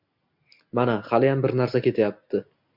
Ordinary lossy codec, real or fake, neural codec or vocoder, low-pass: MP3, 48 kbps; real; none; 5.4 kHz